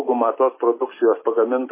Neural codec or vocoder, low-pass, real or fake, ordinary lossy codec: none; 3.6 kHz; real; MP3, 16 kbps